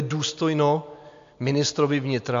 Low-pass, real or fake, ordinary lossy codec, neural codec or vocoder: 7.2 kHz; real; AAC, 64 kbps; none